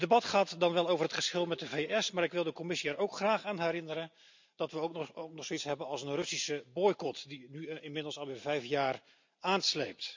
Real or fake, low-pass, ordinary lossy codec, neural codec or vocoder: real; 7.2 kHz; MP3, 64 kbps; none